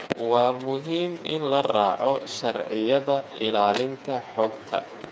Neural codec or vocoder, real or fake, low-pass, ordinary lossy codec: codec, 16 kHz, 4 kbps, FreqCodec, smaller model; fake; none; none